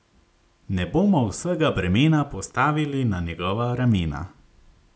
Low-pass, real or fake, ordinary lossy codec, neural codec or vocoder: none; real; none; none